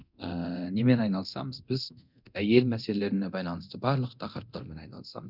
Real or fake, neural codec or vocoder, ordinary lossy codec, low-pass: fake; codec, 24 kHz, 0.9 kbps, DualCodec; none; 5.4 kHz